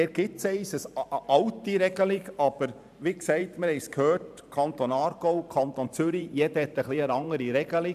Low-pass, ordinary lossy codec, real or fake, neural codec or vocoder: 14.4 kHz; none; fake; vocoder, 44.1 kHz, 128 mel bands every 512 samples, BigVGAN v2